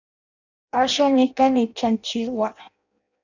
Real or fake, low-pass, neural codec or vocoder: fake; 7.2 kHz; codec, 16 kHz in and 24 kHz out, 0.6 kbps, FireRedTTS-2 codec